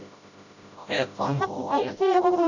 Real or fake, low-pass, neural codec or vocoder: fake; 7.2 kHz; codec, 16 kHz, 0.5 kbps, FreqCodec, smaller model